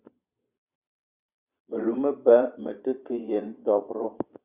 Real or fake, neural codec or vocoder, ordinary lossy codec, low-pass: fake; vocoder, 22.05 kHz, 80 mel bands, Vocos; Opus, 64 kbps; 3.6 kHz